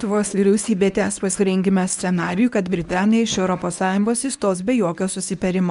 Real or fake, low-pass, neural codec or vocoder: fake; 10.8 kHz; codec, 24 kHz, 0.9 kbps, WavTokenizer, medium speech release version 1